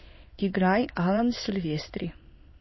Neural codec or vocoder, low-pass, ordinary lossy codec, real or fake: autoencoder, 22.05 kHz, a latent of 192 numbers a frame, VITS, trained on many speakers; 7.2 kHz; MP3, 24 kbps; fake